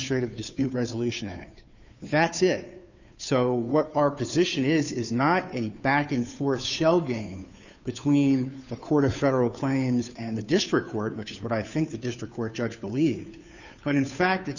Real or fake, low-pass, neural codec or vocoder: fake; 7.2 kHz; codec, 16 kHz, 2 kbps, FunCodec, trained on Chinese and English, 25 frames a second